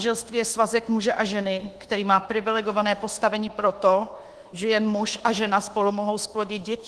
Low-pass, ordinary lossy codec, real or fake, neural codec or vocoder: 10.8 kHz; Opus, 16 kbps; fake; codec, 24 kHz, 1.2 kbps, DualCodec